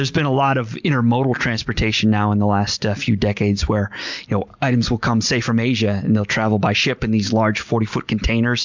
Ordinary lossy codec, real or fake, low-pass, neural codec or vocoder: MP3, 64 kbps; real; 7.2 kHz; none